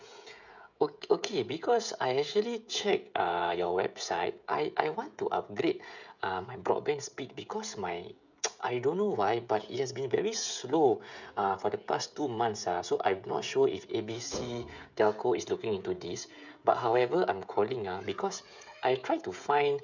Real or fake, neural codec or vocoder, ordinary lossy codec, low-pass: fake; codec, 16 kHz, 16 kbps, FreqCodec, smaller model; none; 7.2 kHz